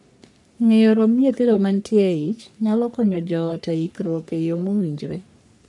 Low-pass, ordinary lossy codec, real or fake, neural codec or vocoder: 10.8 kHz; none; fake; codec, 44.1 kHz, 3.4 kbps, Pupu-Codec